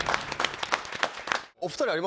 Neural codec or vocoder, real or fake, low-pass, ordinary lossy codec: none; real; none; none